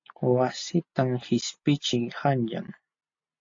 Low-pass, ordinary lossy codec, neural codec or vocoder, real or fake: 7.2 kHz; AAC, 48 kbps; none; real